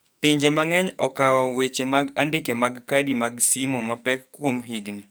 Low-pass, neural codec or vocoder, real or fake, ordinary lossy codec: none; codec, 44.1 kHz, 2.6 kbps, SNAC; fake; none